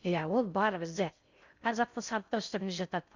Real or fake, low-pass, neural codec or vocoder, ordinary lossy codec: fake; 7.2 kHz; codec, 16 kHz in and 24 kHz out, 0.6 kbps, FocalCodec, streaming, 4096 codes; Opus, 64 kbps